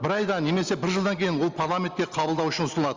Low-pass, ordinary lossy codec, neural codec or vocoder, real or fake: 7.2 kHz; Opus, 32 kbps; none; real